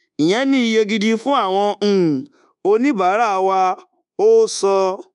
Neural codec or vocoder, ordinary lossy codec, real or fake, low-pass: codec, 24 kHz, 1.2 kbps, DualCodec; none; fake; 10.8 kHz